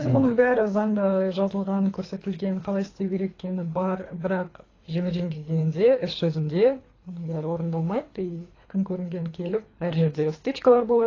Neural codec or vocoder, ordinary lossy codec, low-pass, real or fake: codec, 24 kHz, 3 kbps, HILCodec; AAC, 32 kbps; 7.2 kHz; fake